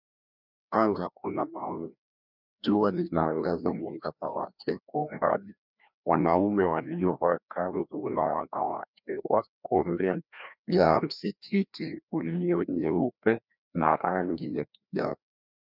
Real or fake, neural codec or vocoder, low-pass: fake; codec, 16 kHz, 1 kbps, FreqCodec, larger model; 5.4 kHz